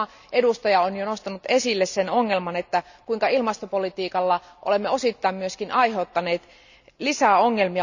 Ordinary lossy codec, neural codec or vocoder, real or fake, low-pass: none; none; real; 7.2 kHz